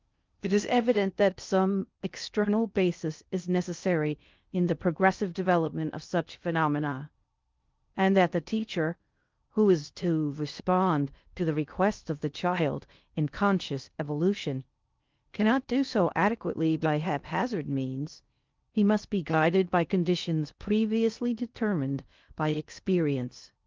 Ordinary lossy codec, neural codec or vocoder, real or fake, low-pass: Opus, 24 kbps; codec, 16 kHz in and 24 kHz out, 0.6 kbps, FocalCodec, streaming, 2048 codes; fake; 7.2 kHz